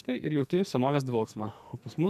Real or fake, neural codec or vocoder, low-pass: fake; codec, 44.1 kHz, 2.6 kbps, SNAC; 14.4 kHz